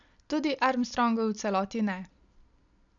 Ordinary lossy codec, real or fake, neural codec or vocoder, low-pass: none; real; none; 7.2 kHz